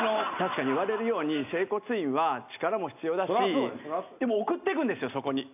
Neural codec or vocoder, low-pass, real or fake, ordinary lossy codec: none; 3.6 kHz; real; none